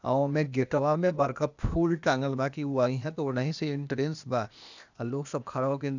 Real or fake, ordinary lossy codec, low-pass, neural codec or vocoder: fake; none; 7.2 kHz; codec, 16 kHz, 0.8 kbps, ZipCodec